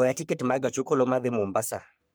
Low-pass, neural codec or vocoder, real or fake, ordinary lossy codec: none; codec, 44.1 kHz, 3.4 kbps, Pupu-Codec; fake; none